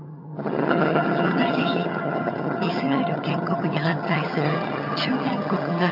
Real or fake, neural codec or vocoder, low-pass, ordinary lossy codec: fake; vocoder, 22.05 kHz, 80 mel bands, HiFi-GAN; 5.4 kHz; none